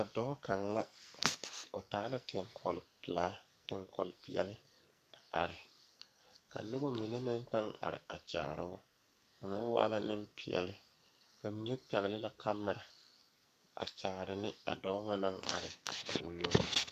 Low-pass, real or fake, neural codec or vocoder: 14.4 kHz; fake; codec, 44.1 kHz, 2.6 kbps, SNAC